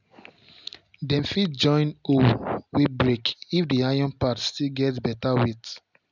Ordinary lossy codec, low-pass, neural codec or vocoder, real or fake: none; 7.2 kHz; none; real